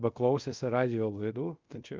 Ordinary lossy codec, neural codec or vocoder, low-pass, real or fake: Opus, 24 kbps; codec, 24 kHz, 0.5 kbps, DualCodec; 7.2 kHz; fake